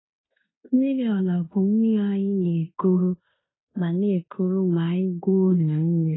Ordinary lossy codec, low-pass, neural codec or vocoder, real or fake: AAC, 16 kbps; 7.2 kHz; codec, 16 kHz in and 24 kHz out, 0.9 kbps, LongCat-Audio-Codec, fine tuned four codebook decoder; fake